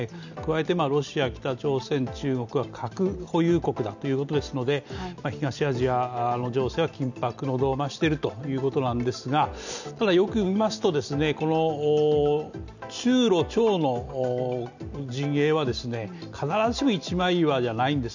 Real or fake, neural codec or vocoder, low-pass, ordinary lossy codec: real; none; 7.2 kHz; none